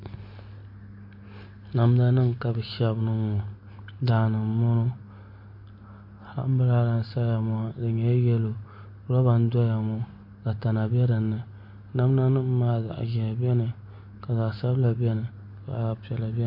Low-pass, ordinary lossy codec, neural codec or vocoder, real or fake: 5.4 kHz; MP3, 32 kbps; none; real